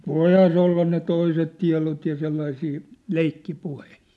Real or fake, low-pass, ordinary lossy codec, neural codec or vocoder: real; none; none; none